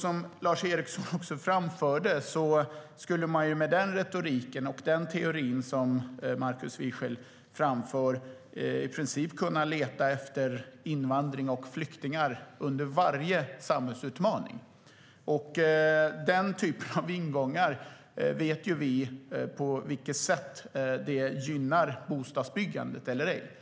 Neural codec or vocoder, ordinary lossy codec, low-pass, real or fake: none; none; none; real